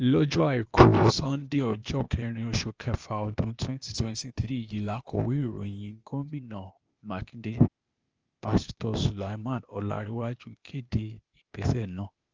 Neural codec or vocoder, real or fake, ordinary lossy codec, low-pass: codec, 16 kHz, 0.8 kbps, ZipCodec; fake; Opus, 24 kbps; 7.2 kHz